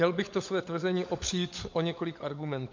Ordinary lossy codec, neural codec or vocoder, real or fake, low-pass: MP3, 48 kbps; codec, 16 kHz, 16 kbps, FunCodec, trained on LibriTTS, 50 frames a second; fake; 7.2 kHz